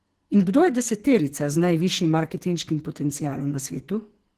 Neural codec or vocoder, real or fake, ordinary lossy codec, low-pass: codec, 44.1 kHz, 2.6 kbps, SNAC; fake; Opus, 16 kbps; 14.4 kHz